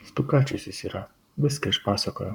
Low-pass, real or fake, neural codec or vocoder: 19.8 kHz; fake; codec, 44.1 kHz, 7.8 kbps, Pupu-Codec